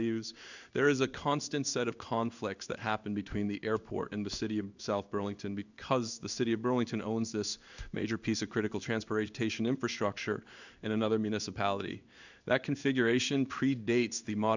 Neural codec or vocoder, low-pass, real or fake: codec, 16 kHz in and 24 kHz out, 1 kbps, XY-Tokenizer; 7.2 kHz; fake